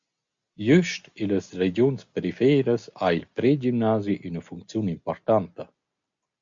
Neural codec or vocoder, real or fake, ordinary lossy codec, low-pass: none; real; MP3, 64 kbps; 7.2 kHz